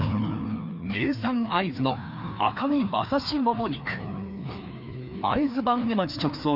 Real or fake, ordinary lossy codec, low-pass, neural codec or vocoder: fake; none; 5.4 kHz; codec, 16 kHz, 2 kbps, FreqCodec, larger model